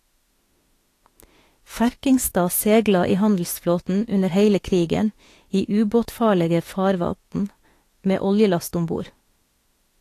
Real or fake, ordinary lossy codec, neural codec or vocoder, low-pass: fake; AAC, 48 kbps; autoencoder, 48 kHz, 32 numbers a frame, DAC-VAE, trained on Japanese speech; 14.4 kHz